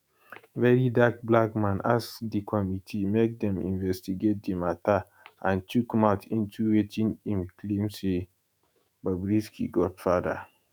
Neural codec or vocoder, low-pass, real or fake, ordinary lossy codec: autoencoder, 48 kHz, 128 numbers a frame, DAC-VAE, trained on Japanese speech; none; fake; none